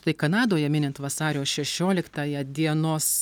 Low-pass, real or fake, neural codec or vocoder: 19.8 kHz; real; none